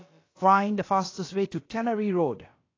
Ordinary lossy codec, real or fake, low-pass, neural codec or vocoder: AAC, 32 kbps; fake; 7.2 kHz; codec, 16 kHz, about 1 kbps, DyCAST, with the encoder's durations